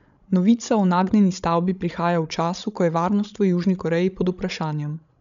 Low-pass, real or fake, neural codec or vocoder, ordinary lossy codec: 7.2 kHz; fake; codec, 16 kHz, 16 kbps, FreqCodec, larger model; MP3, 96 kbps